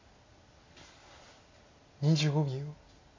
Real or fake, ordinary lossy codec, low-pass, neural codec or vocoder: real; AAC, 32 kbps; 7.2 kHz; none